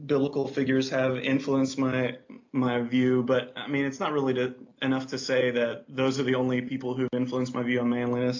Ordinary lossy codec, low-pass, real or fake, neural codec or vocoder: AAC, 48 kbps; 7.2 kHz; real; none